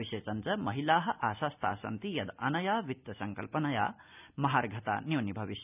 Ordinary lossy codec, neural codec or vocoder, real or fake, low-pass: none; none; real; 3.6 kHz